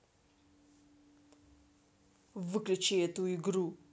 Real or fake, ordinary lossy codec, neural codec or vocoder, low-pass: real; none; none; none